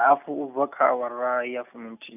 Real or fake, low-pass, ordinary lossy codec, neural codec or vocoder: real; 3.6 kHz; none; none